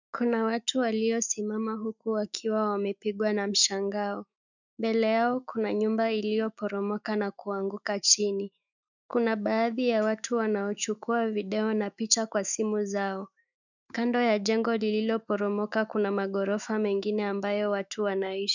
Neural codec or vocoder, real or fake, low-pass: autoencoder, 48 kHz, 128 numbers a frame, DAC-VAE, trained on Japanese speech; fake; 7.2 kHz